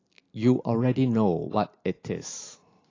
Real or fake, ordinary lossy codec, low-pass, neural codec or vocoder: real; AAC, 32 kbps; 7.2 kHz; none